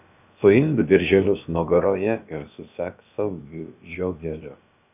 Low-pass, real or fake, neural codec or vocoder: 3.6 kHz; fake; codec, 16 kHz, about 1 kbps, DyCAST, with the encoder's durations